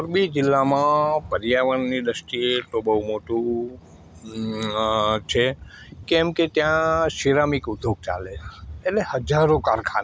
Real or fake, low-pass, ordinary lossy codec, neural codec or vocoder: real; none; none; none